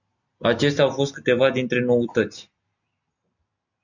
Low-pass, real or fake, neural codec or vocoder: 7.2 kHz; real; none